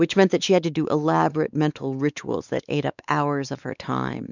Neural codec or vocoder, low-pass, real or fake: codec, 16 kHz, 4 kbps, X-Codec, WavLM features, trained on Multilingual LibriSpeech; 7.2 kHz; fake